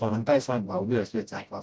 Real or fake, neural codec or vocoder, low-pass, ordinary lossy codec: fake; codec, 16 kHz, 0.5 kbps, FreqCodec, smaller model; none; none